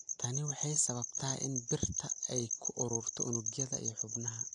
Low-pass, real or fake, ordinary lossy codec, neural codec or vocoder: 10.8 kHz; real; AAC, 64 kbps; none